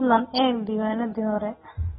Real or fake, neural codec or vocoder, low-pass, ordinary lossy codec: real; none; 19.8 kHz; AAC, 16 kbps